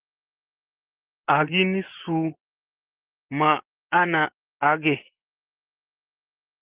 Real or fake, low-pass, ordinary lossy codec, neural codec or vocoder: fake; 3.6 kHz; Opus, 16 kbps; vocoder, 24 kHz, 100 mel bands, Vocos